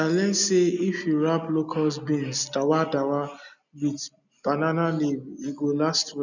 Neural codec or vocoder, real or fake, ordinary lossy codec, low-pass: none; real; none; 7.2 kHz